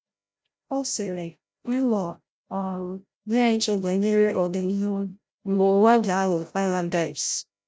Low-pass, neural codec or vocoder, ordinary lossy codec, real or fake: none; codec, 16 kHz, 0.5 kbps, FreqCodec, larger model; none; fake